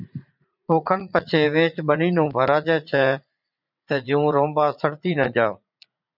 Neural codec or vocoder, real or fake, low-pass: vocoder, 44.1 kHz, 80 mel bands, Vocos; fake; 5.4 kHz